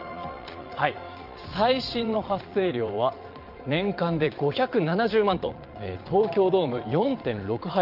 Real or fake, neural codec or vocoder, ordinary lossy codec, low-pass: fake; vocoder, 22.05 kHz, 80 mel bands, Vocos; Opus, 24 kbps; 5.4 kHz